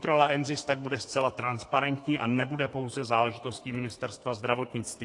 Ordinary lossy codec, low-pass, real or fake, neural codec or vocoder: AAC, 48 kbps; 10.8 kHz; fake; codec, 32 kHz, 1.9 kbps, SNAC